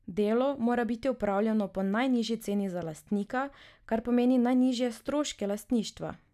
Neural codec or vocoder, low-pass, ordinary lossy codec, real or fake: none; 14.4 kHz; none; real